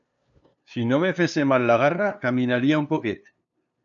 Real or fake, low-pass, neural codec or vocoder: fake; 7.2 kHz; codec, 16 kHz, 2 kbps, FunCodec, trained on LibriTTS, 25 frames a second